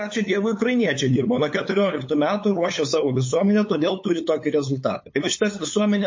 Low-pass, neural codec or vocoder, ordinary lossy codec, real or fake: 7.2 kHz; codec, 16 kHz, 8 kbps, FunCodec, trained on LibriTTS, 25 frames a second; MP3, 32 kbps; fake